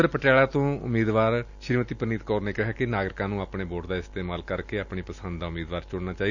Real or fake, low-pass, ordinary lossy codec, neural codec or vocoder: real; 7.2 kHz; none; none